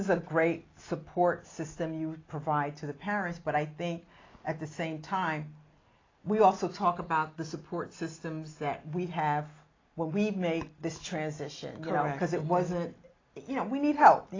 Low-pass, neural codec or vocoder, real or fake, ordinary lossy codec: 7.2 kHz; none; real; AAC, 32 kbps